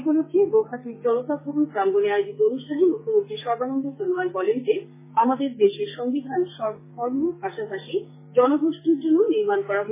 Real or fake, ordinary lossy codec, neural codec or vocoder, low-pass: fake; MP3, 16 kbps; codec, 44.1 kHz, 2.6 kbps, SNAC; 3.6 kHz